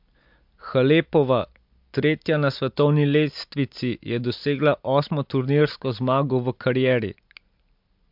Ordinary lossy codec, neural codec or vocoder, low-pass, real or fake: MP3, 48 kbps; none; 5.4 kHz; real